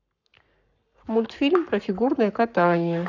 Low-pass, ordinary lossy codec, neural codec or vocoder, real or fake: 7.2 kHz; none; codec, 44.1 kHz, 7.8 kbps, Pupu-Codec; fake